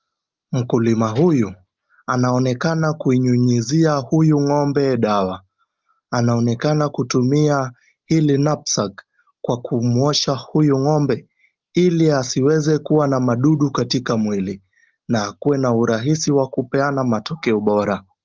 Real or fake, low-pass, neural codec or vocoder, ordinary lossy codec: real; 7.2 kHz; none; Opus, 24 kbps